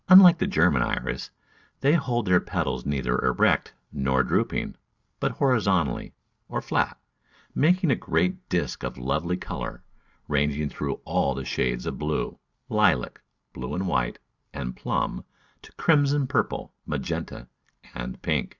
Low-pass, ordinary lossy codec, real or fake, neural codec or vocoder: 7.2 kHz; Opus, 64 kbps; real; none